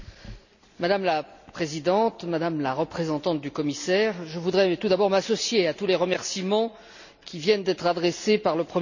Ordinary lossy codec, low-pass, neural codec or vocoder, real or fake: none; 7.2 kHz; none; real